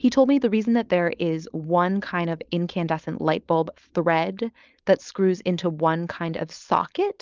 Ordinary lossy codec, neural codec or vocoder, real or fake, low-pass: Opus, 24 kbps; codec, 16 kHz, 4.8 kbps, FACodec; fake; 7.2 kHz